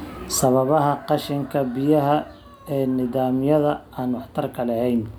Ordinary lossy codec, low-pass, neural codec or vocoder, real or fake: none; none; none; real